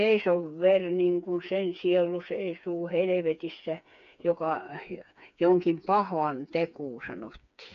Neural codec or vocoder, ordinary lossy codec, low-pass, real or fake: codec, 16 kHz, 4 kbps, FreqCodec, smaller model; none; 7.2 kHz; fake